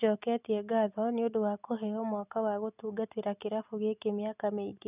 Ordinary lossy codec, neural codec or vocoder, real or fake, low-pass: none; none; real; 3.6 kHz